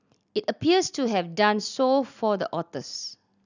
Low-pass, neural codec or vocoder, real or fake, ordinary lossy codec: 7.2 kHz; none; real; none